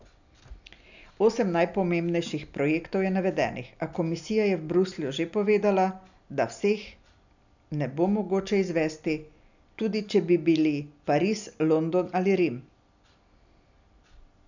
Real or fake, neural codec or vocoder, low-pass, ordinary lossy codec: real; none; 7.2 kHz; none